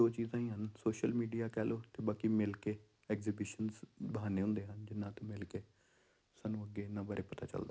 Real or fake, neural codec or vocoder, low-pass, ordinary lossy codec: real; none; none; none